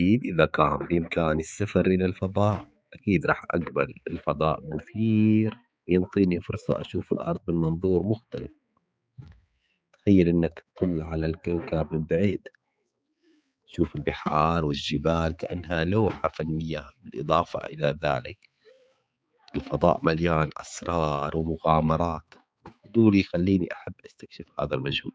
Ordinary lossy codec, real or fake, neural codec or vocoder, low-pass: none; fake; codec, 16 kHz, 4 kbps, X-Codec, HuBERT features, trained on balanced general audio; none